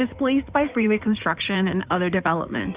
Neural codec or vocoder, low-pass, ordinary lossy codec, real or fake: codec, 16 kHz in and 24 kHz out, 2.2 kbps, FireRedTTS-2 codec; 3.6 kHz; Opus, 64 kbps; fake